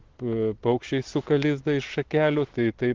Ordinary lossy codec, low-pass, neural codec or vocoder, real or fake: Opus, 16 kbps; 7.2 kHz; none; real